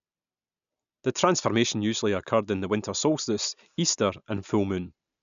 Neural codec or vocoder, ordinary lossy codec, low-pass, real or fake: none; none; 7.2 kHz; real